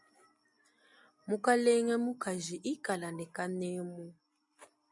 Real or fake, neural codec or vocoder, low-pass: real; none; 10.8 kHz